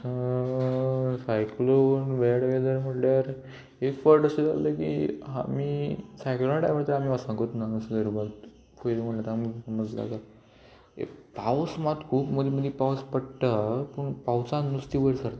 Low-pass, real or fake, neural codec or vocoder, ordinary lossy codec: none; real; none; none